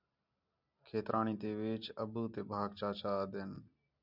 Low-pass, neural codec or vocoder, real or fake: 5.4 kHz; none; real